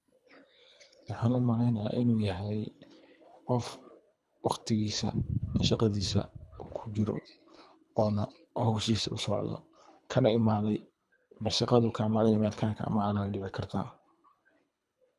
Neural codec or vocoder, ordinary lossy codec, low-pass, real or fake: codec, 24 kHz, 3 kbps, HILCodec; none; none; fake